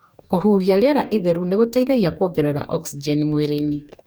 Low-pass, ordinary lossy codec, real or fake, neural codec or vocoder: none; none; fake; codec, 44.1 kHz, 2.6 kbps, DAC